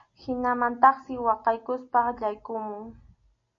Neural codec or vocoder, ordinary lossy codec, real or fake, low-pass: none; MP3, 48 kbps; real; 7.2 kHz